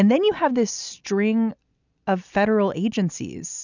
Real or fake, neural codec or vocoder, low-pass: real; none; 7.2 kHz